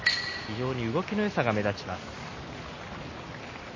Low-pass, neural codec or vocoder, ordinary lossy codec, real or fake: 7.2 kHz; none; MP3, 32 kbps; real